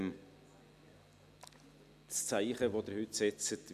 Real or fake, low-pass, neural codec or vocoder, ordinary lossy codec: real; 14.4 kHz; none; none